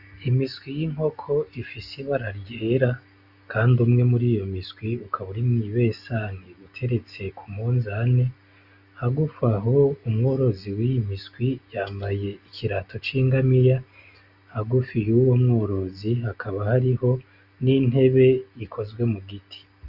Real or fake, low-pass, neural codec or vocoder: real; 5.4 kHz; none